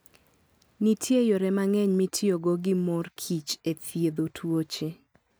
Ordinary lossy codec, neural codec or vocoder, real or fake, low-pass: none; none; real; none